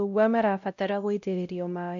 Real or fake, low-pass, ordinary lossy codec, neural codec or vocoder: fake; 7.2 kHz; none; codec, 16 kHz, 0.5 kbps, X-Codec, WavLM features, trained on Multilingual LibriSpeech